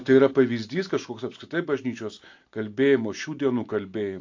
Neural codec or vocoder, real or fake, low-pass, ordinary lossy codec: none; real; 7.2 kHz; AAC, 48 kbps